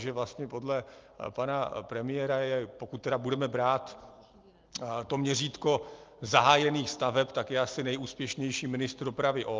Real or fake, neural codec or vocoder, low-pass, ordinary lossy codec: real; none; 7.2 kHz; Opus, 24 kbps